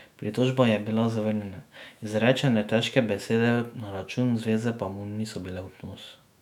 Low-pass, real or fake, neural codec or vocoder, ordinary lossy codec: 19.8 kHz; fake; autoencoder, 48 kHz, 128 numbers a frame, DAC-VAE, trained on Japanese speech; none